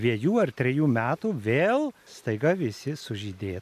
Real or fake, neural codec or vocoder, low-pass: real; none; 14.4 kHz